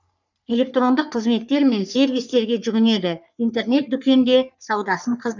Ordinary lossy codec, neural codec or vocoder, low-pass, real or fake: none; codec, 44.1 kHz, 3.4 kbps, Pupu-Codec; 7.2 kHz; fake